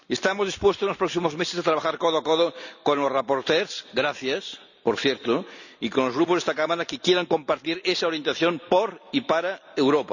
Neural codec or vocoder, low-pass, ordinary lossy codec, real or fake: none; 7.2 kHz; none; real